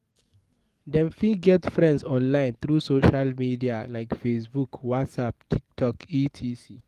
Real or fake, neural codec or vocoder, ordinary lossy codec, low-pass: fake; codec, 44.1 kHz, 7.8 kbps, DAC; Opus, 24 kbps; 14.4 kHz